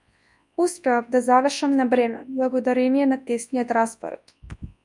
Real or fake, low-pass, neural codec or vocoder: fake; 10.8 kHz; codec, 24 kHz, 0.9 kbps, WavTokenizer, large speech release